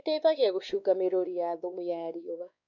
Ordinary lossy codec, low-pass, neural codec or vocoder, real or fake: none; 7.2 kHz; codec, 16 kHz, 2 kbps, X-Codec, WavLM features, trained on Multilingual LibriSpeech; fake